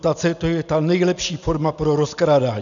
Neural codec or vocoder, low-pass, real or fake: none; 7.2 kHz; real